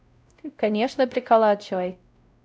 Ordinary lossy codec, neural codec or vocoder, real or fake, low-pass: none; codec, 16 kHz, 0.5 kbps, X-Codec, WavLM features, trained on Multilingual LibriSpeech; fake; none